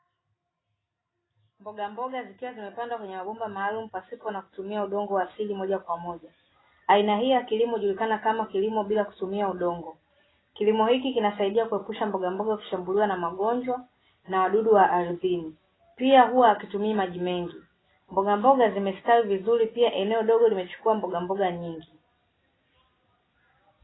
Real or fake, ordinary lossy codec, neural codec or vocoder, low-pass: real; AAC, 16 kbps; none; 7.2 kHz